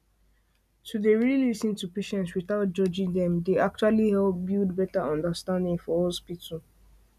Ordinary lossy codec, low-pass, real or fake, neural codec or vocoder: none; 14.4 kHz; real; none